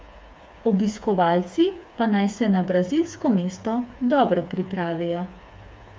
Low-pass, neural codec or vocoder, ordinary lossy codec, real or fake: none; codec, 16 kHz, 4 kbps, FreqCodec, smaller model; none; fake